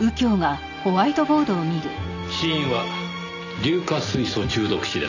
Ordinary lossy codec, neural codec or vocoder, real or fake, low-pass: none; none; real; 7.2 kHz